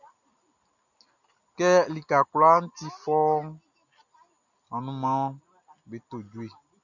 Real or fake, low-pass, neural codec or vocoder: real; 7.2 kHz; none